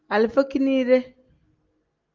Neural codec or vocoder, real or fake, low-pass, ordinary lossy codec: none; real; 7.2 kHz; Opus, 32 kbps